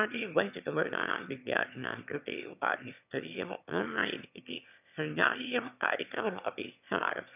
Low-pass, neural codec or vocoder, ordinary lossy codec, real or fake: 3.6 kHz; autoencoder, 22.05 kHz, a latent of 192 numbers a frame, VITS, trained on one speaker; none; fake